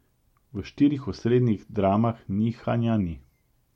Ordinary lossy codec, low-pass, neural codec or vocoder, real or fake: MP3, 64 kbps; 19.8 kHz; none; real